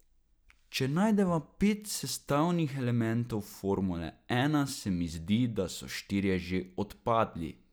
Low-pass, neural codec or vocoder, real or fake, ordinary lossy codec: none; none; real; none